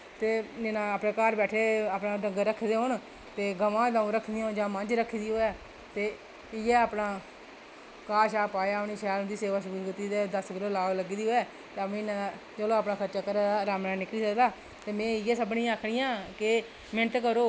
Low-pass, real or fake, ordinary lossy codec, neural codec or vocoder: none; real; none; none